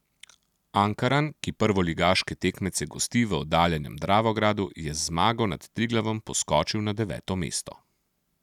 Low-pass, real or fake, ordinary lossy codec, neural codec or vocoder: 19.8 kHz; real; none; none